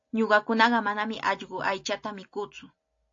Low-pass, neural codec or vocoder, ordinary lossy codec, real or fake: 7.2 kHz; none; AAC, 48 kbps; real